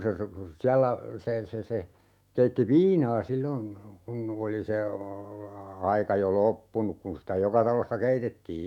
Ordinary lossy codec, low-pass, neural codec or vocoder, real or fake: none; 19.8 kHz; autoencoder, 48 kHz, 128 numbers a frame, DAC-VAE, trained on Japanese speech; fake